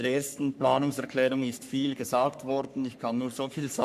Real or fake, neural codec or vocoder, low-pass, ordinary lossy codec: fake; codec, 44.1 kHz, 3.4 kbps, Pupu-Codec; 14.4 kHz; none